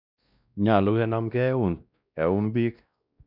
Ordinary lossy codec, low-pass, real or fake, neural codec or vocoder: none; 5.4 kHz; fake; codec, 16 kHz, 1 kbps, X-Codec, WavLM features, trained on Multilingual LibriSpeech